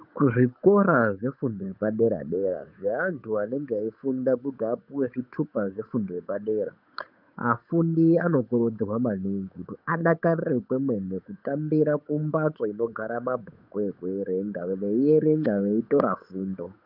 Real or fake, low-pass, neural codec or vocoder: fake; 5.4 kHz; codec, 16 kHz, 8 kbps, FunCodec, trained on Chinese and English, 25 frames a second